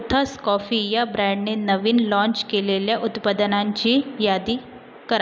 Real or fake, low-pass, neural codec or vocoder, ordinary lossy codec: real; none; none; none